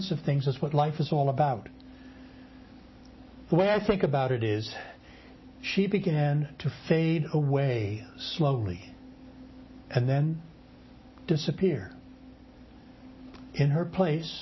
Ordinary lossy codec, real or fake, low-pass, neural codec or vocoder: MP3, 24 kbps; real; 7.2 kHz; none